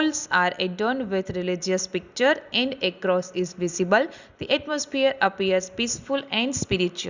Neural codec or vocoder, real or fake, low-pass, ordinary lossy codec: none; real; 7.2 kHz; Opus, 64 kbps